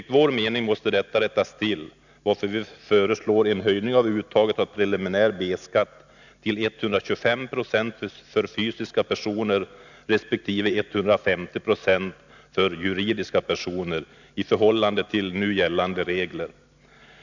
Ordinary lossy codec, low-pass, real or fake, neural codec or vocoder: none; 7.2 kHz; real; none